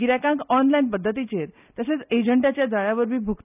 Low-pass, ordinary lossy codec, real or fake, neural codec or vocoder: 3.6 kHz; none; real; none